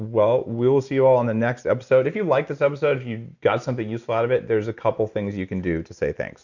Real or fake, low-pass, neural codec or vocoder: real; 7.2 kHz; none